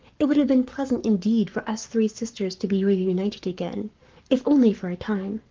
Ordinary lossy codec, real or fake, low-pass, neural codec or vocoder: Opus, 32 kbps; fake; 7.2 kHz; codec, 44.1 kHz, 7.8 kbps, Pupu-Codec